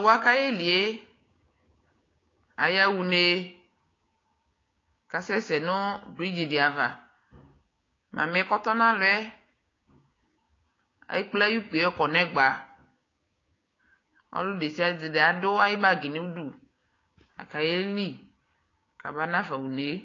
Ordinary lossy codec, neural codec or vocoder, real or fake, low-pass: AAC, 32 kbps; codec, 16 kHz, 6 kbps, DAC; fake; 7.2 kHz